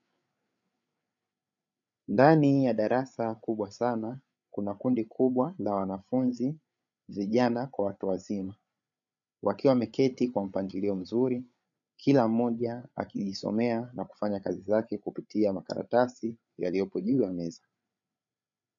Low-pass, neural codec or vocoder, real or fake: 7.2 kHz; codec, 16 kHz, 8 kbps, FreqCodec, larger model; fake